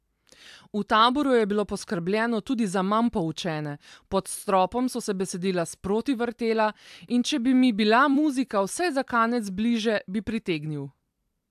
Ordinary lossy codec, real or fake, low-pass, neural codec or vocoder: AAC, 96 kbps; fake; 14.4 kHz; vocoder, 44.1 kHz, 128 mel bands every 256 samples, BigVGAN v2